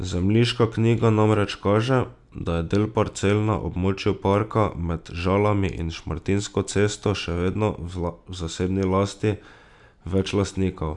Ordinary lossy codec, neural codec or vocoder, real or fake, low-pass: none; none; real; 10.8 kHz